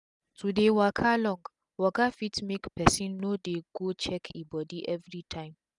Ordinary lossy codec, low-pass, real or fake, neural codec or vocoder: none; 10.8 kHz; real; none